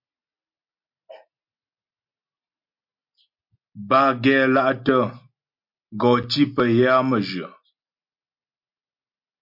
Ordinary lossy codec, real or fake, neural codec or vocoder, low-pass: MP3, 48 kbps; real; none; 5.4 kHz